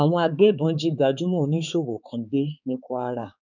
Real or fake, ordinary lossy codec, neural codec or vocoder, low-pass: fake; none; codec, 16 kHz, 4 kbps, X-Codec, HuBERT features, trained on balanced general audio; 7.2 kHz